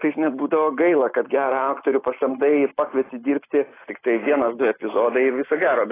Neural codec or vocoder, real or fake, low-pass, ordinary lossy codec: none; real; 3.6 kHz; AAC, 16 kbps